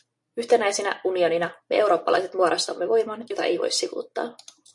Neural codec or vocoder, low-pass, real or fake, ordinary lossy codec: none; 10.8 kHz; real; MP3, 48 kbps